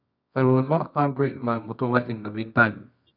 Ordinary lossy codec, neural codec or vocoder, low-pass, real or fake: none; codec, 24 kHz, 0.9 kbps, WavTokenizer, medium music audio release; 5.4 kHz; fake